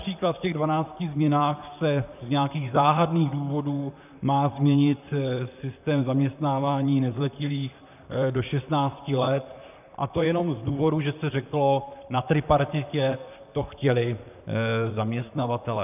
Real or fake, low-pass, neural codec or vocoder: fake; 3.6 kHz; vocoder, 44.1 kHz, 128 mel bands, Pupu-Vocoder